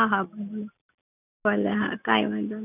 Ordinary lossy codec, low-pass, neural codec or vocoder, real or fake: none; 3.6 kHz; none; real